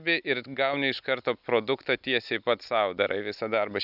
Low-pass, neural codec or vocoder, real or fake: 5.4 kHz; none; real